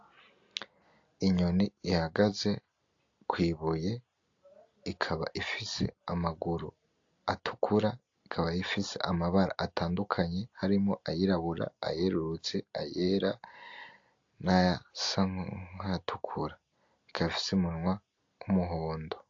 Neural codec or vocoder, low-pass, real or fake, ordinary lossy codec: none; 7.2 kHz; real; AAC, 48 kbps